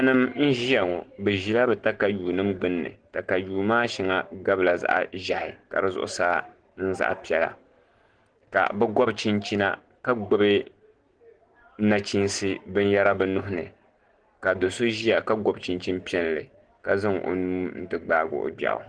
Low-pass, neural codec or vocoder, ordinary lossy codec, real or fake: 9.9 kHz; none; Opus, 16 kbps; real